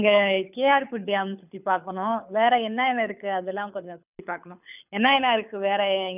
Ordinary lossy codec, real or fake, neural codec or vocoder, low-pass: none; fake; codec, 24 kHz, 6 kbps, HILCodec; 3.6 kHz